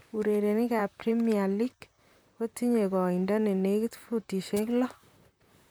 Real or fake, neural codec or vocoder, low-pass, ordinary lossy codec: fake; vocoder, 44.1 kHz, 128 mel bands, Pupu-Vocoder; none; none